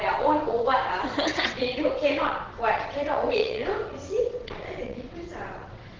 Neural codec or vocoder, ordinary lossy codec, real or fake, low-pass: vocoder, 22.05 kHz, 80 mel bands, WaveNeXt; Opus, 16 kbps; fake; 7.2 kHz